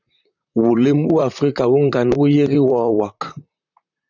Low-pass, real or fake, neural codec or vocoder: 7.2 kHz; fake; vocoder, 44.1 kHz, 128 mel bands, Pupu-Vocoder